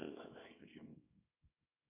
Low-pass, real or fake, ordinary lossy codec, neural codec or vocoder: 3.6 kHz; fake; MP3, 24 kbps; codec, 24 kHz, 0.9 kbps, WavTokenizer, small release